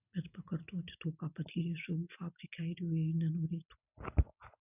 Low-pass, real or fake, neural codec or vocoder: 3.6 kHz; real; none